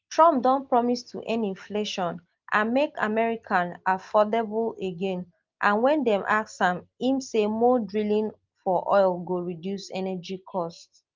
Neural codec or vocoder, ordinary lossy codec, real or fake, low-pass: none; Opus, 32 kbps; real; 7.2 kHz